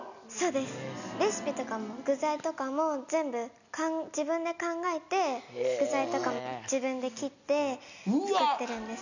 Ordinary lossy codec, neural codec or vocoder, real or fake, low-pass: none; none; real; 7.2 kHz